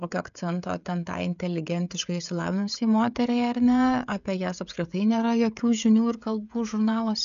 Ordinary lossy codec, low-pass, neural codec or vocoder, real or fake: MP3, 96 kbps; 7.2 kHz; codec, 16 kHz, 16 kbps, FreqCodec, smaller model; fake